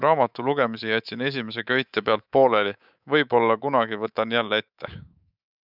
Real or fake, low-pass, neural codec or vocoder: fake; 5.4 kHz; codec, 24 kHz, 3.1 kbps, DualCodec